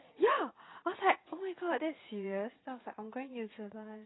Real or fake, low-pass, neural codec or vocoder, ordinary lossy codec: fake; 7.2 kHz; vocoder, 44.1 kHz, 80 mel bands, Vocos; AAC, 16 kbps